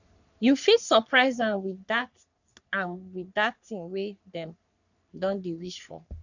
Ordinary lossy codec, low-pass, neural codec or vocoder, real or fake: none; 7.2 kHz; codec, 44.1 kHz, 3.4 kbps, Pupu-Codec; fake